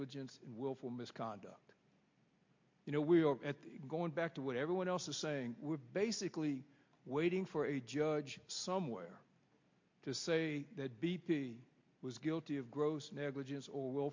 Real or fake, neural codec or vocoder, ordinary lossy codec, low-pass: real; none; MP3, 48 kbps; 7.2 kHz